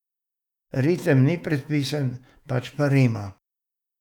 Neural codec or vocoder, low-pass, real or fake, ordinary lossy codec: autoencoder, 48 kHz, 128 numbers a frame, DAC-VAE, trained on Japanese speech; 19.8 kHz; fake; none